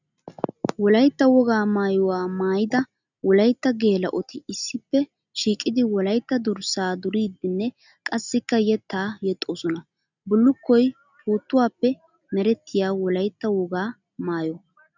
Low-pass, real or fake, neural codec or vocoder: 7.2 kHz; real; none